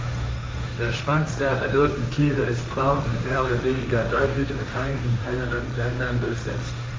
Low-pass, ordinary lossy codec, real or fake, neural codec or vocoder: none; none; fake; codec, 16 kHz, 1.1 kbps, Voila-Tokenizer